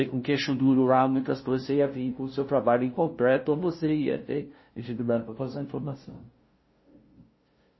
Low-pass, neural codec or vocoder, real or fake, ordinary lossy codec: 7.2 kHz; codec, 16 kHz, 0.5 kbps, FunCodec, trained on LibriTTS, 25 frames a second; fake; MP3, 24 kbps